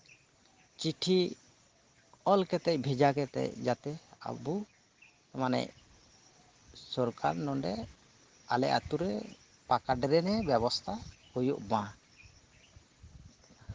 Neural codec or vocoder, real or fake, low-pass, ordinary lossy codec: none; real; 7.2 kHz; Opus, 16 kbps